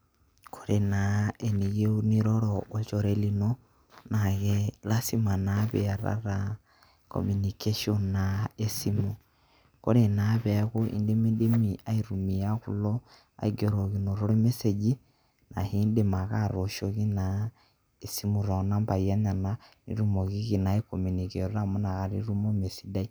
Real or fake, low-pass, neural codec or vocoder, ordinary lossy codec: real; none; none; none